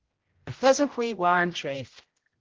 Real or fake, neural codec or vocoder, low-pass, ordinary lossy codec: fake; codec, 16 kHz, 0.5 kbps, X-Codec, HuBERT features, trained on general audio; 7.2 kHz; Opus, 16 kbps